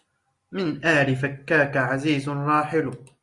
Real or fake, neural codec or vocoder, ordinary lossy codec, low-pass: real; none; Opus, 64 kbps; 10.8 kHz